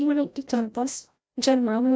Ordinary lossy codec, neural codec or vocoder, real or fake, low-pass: none; codec, 16 kHz, 0.5 kbps, FreqCodec, larger model; fake; none